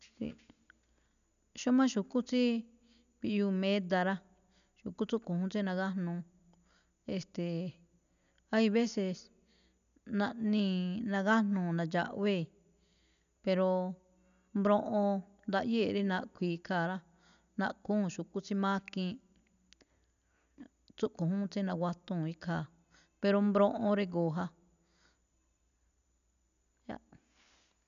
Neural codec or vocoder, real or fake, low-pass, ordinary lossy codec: none; real; 7.2 kHz; none